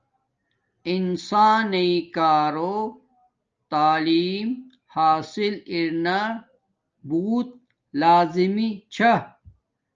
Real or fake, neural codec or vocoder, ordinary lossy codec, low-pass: real; none; Opus, 24 kbps; 7.2 kHz